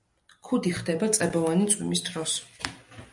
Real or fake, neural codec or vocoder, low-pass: real; none; 10.8 kHz